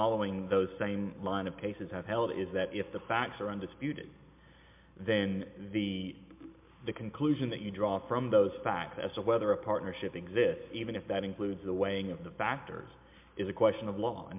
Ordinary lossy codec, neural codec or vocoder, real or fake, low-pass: MP3, 32 kbps; none; real; 3.6 kHz